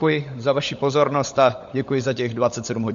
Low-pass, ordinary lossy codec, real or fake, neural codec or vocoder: 7.2 kHz; MP3, 48 kbps; fake; codec, 16 kHz, 16 kbps, FunCodec, trained on LibriTTS, 50 frames a second